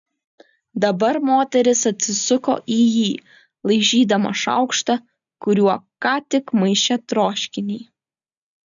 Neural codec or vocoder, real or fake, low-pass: none; real; 7.2 kHz